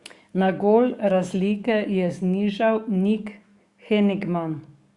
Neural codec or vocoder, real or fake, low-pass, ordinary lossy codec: codec, 44.1 kHz, 7.8 kbps, DAC; fake; 10.8 kHz; Opus, 64 kbps